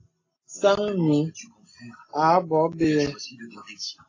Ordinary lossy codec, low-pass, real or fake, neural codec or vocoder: AAC, 32 kbps; 7.2 kHz; real; none